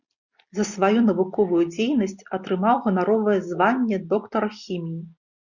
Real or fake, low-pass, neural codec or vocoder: real; 7.2 kHz; none